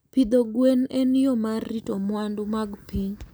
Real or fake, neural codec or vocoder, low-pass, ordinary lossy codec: real; none; none; none